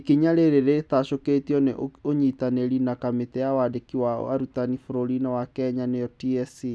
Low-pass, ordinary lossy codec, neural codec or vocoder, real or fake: none; none; none; real